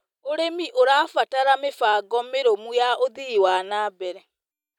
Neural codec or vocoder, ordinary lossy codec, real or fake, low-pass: none; none; real; 19.8 kHz